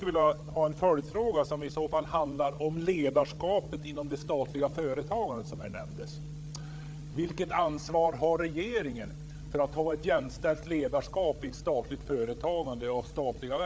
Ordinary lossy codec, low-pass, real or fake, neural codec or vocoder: none; none; fake; codec, 16 kHz, 8 kbps, FreqCodec, larger model